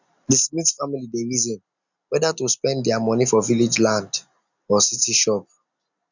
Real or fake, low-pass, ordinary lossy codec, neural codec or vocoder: real; 7.2 kHz; none; none